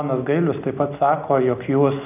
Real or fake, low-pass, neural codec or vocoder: real; 3.6 kHz; none